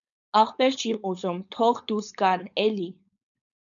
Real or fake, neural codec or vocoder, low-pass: fake; codec, 16 kHz, 4.8 kbps, FACodec; 7.2 kHz